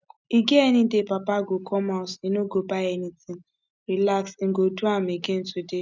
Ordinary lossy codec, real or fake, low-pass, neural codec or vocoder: none; real; none; none